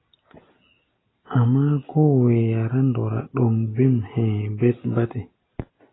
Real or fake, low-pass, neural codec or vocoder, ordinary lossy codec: real; 7.2 kHz; none; AAC, 16 kbps